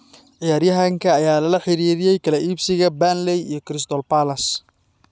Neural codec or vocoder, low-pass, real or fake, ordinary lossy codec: none; none; real; none